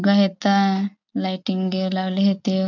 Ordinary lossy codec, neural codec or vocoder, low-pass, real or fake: none; none; 7.2 kHz; real